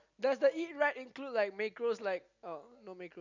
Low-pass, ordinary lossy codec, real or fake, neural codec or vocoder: 7.2 kHz; none; real; none